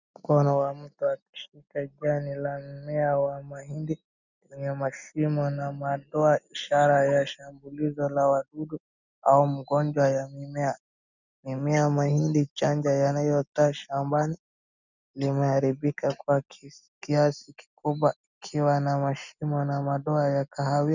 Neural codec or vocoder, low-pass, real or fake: none; 7.2 kHz; real